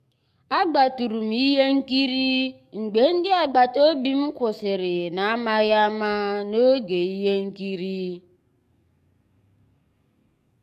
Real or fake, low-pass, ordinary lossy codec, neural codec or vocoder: fake; 14.4 kHz; MP3, 96 kbps; codec, 44.1 kHz, 7.8 kbps, DAC